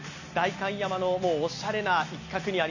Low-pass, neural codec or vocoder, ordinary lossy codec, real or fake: 7.2 kHz; none; none; real